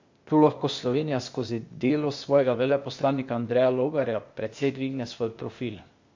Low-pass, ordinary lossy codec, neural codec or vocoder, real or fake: 7.2 kHz; MP3, 48 kbps; codec, 16 kHz, 0.8 kbps, ZipCodec; fake